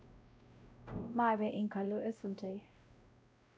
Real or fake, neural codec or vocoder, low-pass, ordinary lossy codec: fake; codec, 16 kHz, 0.5 kbps, X-Codec, WavLM features, trained on Multilingual LibriSpeech; none; none